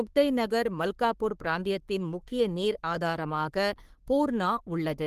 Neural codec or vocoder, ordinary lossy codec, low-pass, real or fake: codec, 44.1 kHz, 3.4 kbps, Pupu-Codec; Opus, 24 kbps; 14.4 kHz; fake